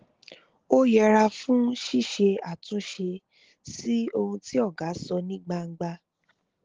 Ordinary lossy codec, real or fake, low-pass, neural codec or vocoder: Opus, 16 kbps; real; 7.2 kHz; none